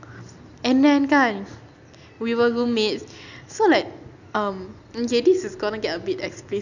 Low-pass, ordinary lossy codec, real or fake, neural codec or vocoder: 7.2 kHz; none; real; none